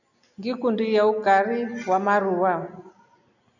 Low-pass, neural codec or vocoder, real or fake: 7.2 kHz; none; real